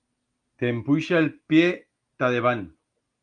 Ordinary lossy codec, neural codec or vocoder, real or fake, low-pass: Opus, 32 kbps; none; real; 9.9 kHz